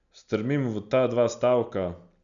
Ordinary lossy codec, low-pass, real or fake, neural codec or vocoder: none; 7.2 kHz; real; none